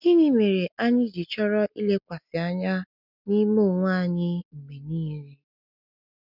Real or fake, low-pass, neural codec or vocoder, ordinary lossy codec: real; 5.4 kHz; none; none